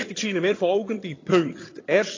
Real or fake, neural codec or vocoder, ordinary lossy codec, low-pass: fake; vocoder, 22.05 kHz, 80 mel bands, HiFi-GAN; AAC, 32 kbps; 7.2 kHz